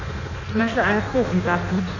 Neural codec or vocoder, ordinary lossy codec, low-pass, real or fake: codec, 16 kHz in and 24 kHz out, 0.6 kbps, FireRedTTS-2 codec; none; 7.2 kHz; fake